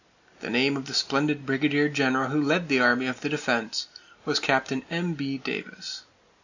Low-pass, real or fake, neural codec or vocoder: 7.2 kHz; real; none